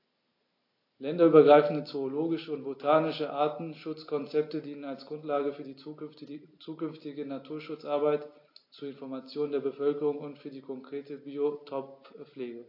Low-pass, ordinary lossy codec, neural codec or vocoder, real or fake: 5.4 kHz; AAC, 32 kbps; none; real